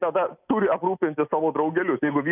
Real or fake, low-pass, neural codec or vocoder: real; 3.6 kHz; none